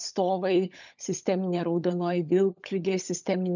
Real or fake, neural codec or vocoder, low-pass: fake; codec, 16 kHz, 16 kbps, FunCodec, trained on LibriTTS, 50 frames a second; 7.2 kHz